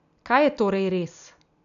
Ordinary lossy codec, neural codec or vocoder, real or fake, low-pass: none; none; real; 7.2 kHz